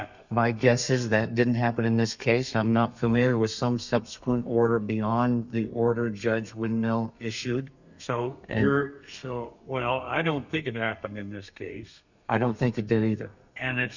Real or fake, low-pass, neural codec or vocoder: fake; 7.2 kHz; codec, 32 kHz, 1.9 kbps, SNAC